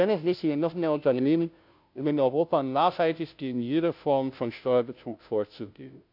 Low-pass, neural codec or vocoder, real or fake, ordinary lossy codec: 5.4 kHz; codec, 16 kHz, 0.5 kbps, FunCodec, trained on Chinese and English, 25 frames a second; fake; none